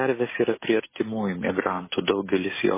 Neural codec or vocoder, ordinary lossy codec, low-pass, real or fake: none; MP3, 16 kbps; 3.6 kHz; real